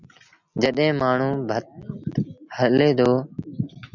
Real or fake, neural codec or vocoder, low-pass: real; none; 7.2 kHz